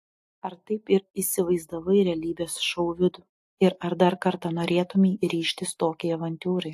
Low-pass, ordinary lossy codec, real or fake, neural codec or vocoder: 14.4 kHz; AAC, 64 kbps; real; none